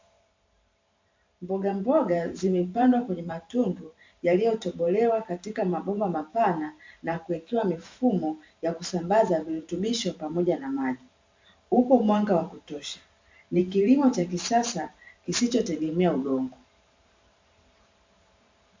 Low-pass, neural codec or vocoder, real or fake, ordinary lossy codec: 7.2 kHz; vocoder, 44.1 kHz, 128 mel bands every 512 samples, BigVGAN v2; fake; MP3, 64 kbps